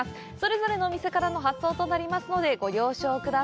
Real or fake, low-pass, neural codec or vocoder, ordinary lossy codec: real; none; none; none